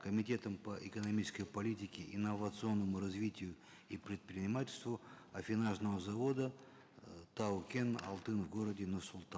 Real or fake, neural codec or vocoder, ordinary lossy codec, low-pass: real; none; none; none